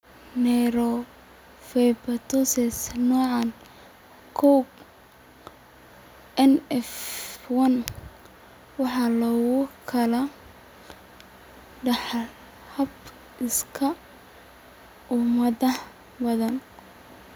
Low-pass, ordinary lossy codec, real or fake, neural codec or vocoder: none; none; real; none